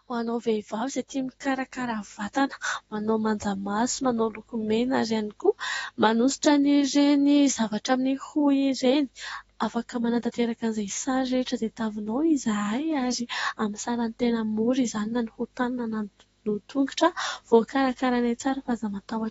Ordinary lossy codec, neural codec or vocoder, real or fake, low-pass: AAC, 24 kbps; autoencoder, 48 kHz, 128 numbers a frame, DAC-VAE, trained on Japanese speech; fake; 19.8 kHz